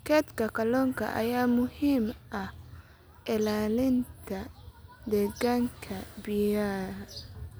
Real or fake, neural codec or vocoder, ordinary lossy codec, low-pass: real; none; none; none